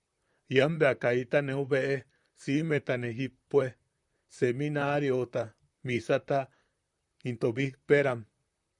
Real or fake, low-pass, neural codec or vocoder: fake; 10.8 kHz; vocoder, 44.1 kHz, 128 mel bands, Pupu-Vocoder